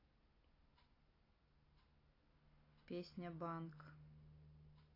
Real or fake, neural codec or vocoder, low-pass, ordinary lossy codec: real; none; 5.4 kHz; AAC, 24 kbps